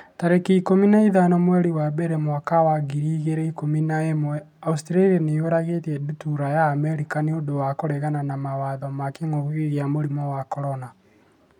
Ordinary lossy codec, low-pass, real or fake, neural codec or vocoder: none; 19.8 kHz; real; none